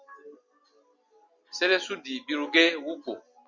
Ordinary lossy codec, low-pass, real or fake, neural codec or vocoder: Opus, 64 kbps; 7.2 kHz; real; none